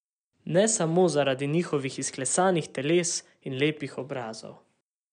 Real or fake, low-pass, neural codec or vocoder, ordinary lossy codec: real; 9.9 kHz; none; none